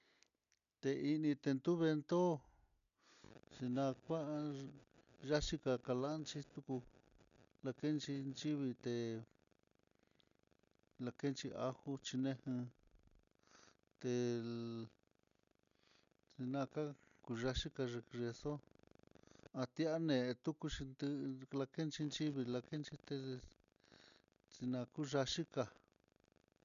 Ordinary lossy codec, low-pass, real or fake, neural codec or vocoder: MP3, 96 kbps; 7.2 kHz; real; none